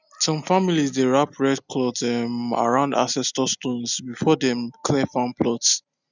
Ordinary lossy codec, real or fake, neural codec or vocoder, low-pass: none; real; none; 7.2 kHz